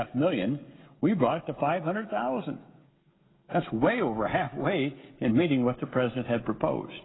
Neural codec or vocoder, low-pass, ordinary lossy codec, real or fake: codec, 16 kHz, 16 kbps, FreqCodec, smaller model; 7.2 kHz; AAC, 16 kbps; fake